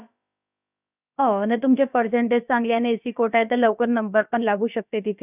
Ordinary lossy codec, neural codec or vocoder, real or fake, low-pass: none; codec, 16 kHz, about 1 kbps, DyCAST, with the encoder's durations; fake; 3.6 kHz